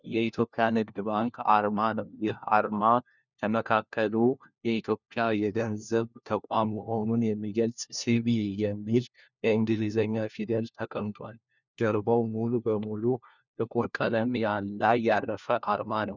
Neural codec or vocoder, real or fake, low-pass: codec, 16 kHz, 1 kbps, FunCodec, trained on LibriTTS, 50 frames a second; fake; 7.2 kHz